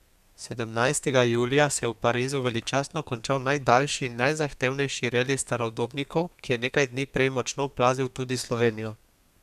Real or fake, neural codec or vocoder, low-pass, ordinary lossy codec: fake; codec, 32 kHz, 1.9 kbps, SNAC; 14.4 kHz; none